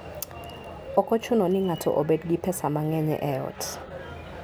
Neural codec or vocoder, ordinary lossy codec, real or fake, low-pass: none; none; real; none